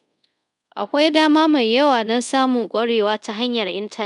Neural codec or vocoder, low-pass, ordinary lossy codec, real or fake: codec, 24 kHz, 0.5 kbps, DualCodec; 10.8 kHz; none; fake